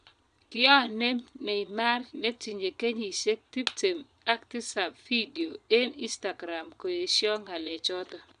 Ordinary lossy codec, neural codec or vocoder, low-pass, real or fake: none; vocoder, 22.05 kHz, 80 mel bands, Vocos; 9.9 kHz; fake